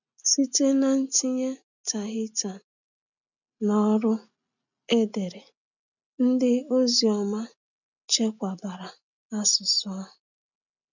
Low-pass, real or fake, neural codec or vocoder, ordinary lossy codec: 7.2 kHz; real; none; none